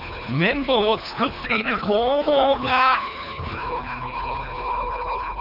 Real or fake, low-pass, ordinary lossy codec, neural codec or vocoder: fake; 5.4 kHz; MP3, 48 kbps; codec, 24 kHz, 3 kbps, HILCodec